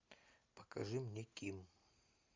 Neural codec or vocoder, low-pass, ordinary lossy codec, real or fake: none; 7.2 kHz; MP3, 64 kbps; real